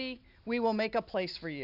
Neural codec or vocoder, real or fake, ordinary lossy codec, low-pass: none; real; AAC, 48 kbps; 5.4 kHz